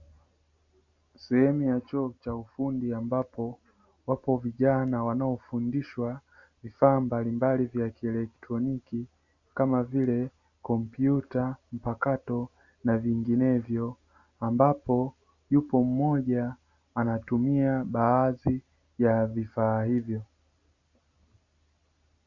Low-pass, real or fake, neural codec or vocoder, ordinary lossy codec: 7.2 kHz; real; none; Opus, 64 kbps